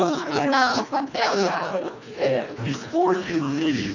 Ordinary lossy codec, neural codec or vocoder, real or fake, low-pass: none; codec, 24 kHz, 1.5 kbps, HILCodec; fake; 7.2 kHz